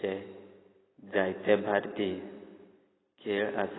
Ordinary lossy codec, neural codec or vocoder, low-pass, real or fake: AAC, 16 kbps; none; 7.2 kHz; real